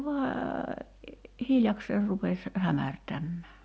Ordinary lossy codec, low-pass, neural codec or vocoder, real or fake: none; none; none; real